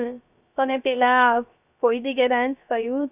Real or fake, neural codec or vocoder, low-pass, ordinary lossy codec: fake; codec, 16 kHz, 0.3 kbps, FocalCodec; 3.6 kHz; none